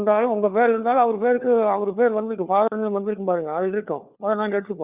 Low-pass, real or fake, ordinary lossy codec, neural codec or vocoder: 3.6 kHz; fake; Opus, 64 kbps; codec, 16 kHz, 4 kbps, FunCodec, trained on LibriTTS, 50 frames a second